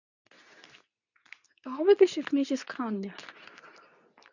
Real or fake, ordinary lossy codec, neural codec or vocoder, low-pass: fake; none; codec, 24 kHz, 0.9 kbps, WavTokenizer, medium speech release version 2; 7.2 kHz